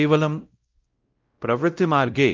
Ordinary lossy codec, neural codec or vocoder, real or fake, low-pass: Opus, 24 kbps; codec, 16 kHz, 0.5 kbps, X-Codec, WavLM features, trained on Multilingual LibriSpeech; fake; 7.2 kHz